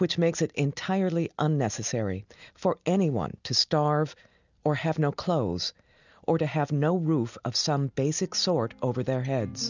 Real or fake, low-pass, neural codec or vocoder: real; 7.2 kHz; none